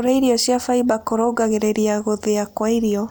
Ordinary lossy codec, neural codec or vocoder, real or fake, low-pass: none; none; real; none